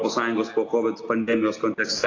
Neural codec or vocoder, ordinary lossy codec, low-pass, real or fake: none; AAC, 32 kbps; 7.2 kHz; real